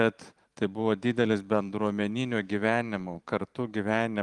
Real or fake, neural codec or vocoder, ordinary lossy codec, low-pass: fake; vocoder, 44.1 kHz, 128 mel bands every 512 samples, BigVGAN v2; Opus, 24 kbps; 10.8 kHz